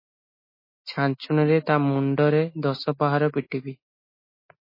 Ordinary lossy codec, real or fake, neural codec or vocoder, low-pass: MP3, 24 kbps; real; none; 5.4 kHz